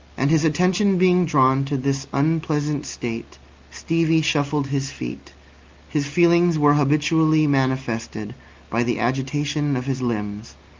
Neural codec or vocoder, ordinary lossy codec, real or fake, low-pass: none; Opus, 32 kbps; real; 7.2 kHz